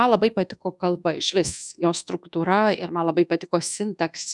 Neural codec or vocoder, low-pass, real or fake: codec, 24 kHz, 1.2 kbps, DualCodec; 10.8 kHz; fake